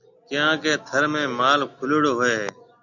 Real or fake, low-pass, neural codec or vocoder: real; 7.2 kHz; none